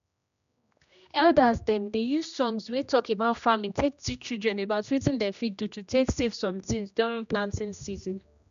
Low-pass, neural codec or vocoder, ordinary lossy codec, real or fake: 7.2 kHz; codec, 16 kHz, 1 kbps, X-Codec, HuBERT features, trained on general audio; none; fake